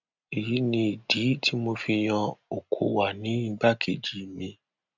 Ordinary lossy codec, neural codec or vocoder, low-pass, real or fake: none; none; 7.2 kHz; real